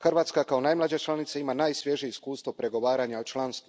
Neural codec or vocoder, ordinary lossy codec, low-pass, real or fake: none; none; none; real